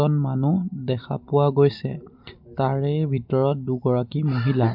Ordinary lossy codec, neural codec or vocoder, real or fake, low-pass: MP3, 48 kbps; none; real; 5.4 kHz